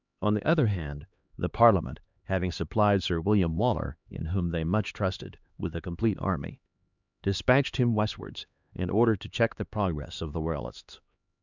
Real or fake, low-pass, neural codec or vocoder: fake; 7.2 kHz; codec, 16 kHz, 2 kbps, X-Codec, HuBERT features, trained on LibriSpeech